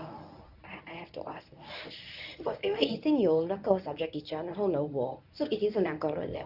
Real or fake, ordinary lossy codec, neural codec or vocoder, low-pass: fake; none; codec, 24 kHz, 0.9 kbps, WavTokenizer, medium speech release version 1; 5.4 kHz